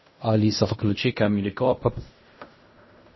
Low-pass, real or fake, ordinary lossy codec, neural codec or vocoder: 7.2 kHz; fake; MP3, 24 kbps; codec, 16 kHz in and 24 kHz out, 0.4 kbps, LongCat-Audio-Codec, fine tuned four codebook decoder